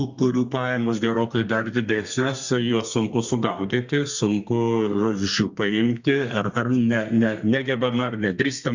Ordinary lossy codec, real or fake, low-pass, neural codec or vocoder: Opus, 64 kbps; fake; 7.2 kHz; codec, 32 kHz, 1.9 kbps, SNAC